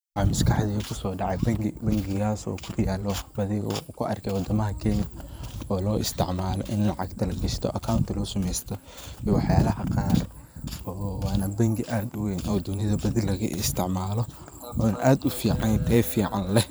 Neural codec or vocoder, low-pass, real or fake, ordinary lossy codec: vocoder, 44.1 kHz, 128 mel bands every 512 samples, BigVGAN v2; none; fake; none